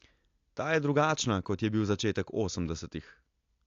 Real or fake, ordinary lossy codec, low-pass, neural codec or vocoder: real; AAC, 48 kbps; 7.2 kHz; none